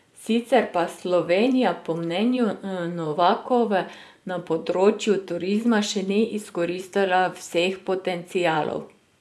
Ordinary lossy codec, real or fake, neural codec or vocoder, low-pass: none; fake; vocoder, 24 kHz, 100 mel bands, Vocos; none